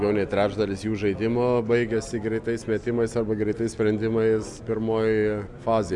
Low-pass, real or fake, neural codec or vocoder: 10.8 kHz; real; none